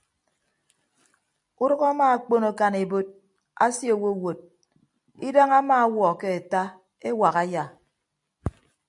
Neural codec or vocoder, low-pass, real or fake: none; 10.8 kHz; real